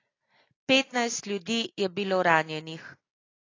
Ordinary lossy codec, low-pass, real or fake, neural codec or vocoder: AAC, 32 kbps; 7.2 kHz; real; none